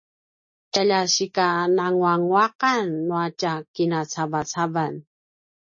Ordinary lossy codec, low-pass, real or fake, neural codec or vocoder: MP3, 32 kbps; 7.2 kHz; real; none